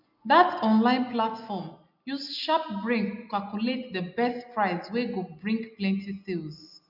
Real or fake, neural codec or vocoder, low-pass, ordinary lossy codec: real; none; 5.4 kHz; none